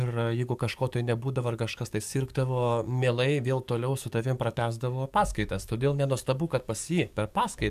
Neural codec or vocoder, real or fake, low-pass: codec, 44.1 kHz, 7.8 kbps, DAC; fake; 14.4 kHz